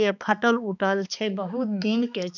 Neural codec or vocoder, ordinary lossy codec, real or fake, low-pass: codec, 16 kHz, 2 kbps, X-Codec, HuBERT features, trained on balanced general audio; none; fake; 7.2 kHz